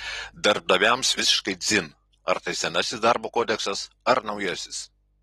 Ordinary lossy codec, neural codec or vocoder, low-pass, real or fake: AAC, 32 kbps; none; 19.8 kHz; real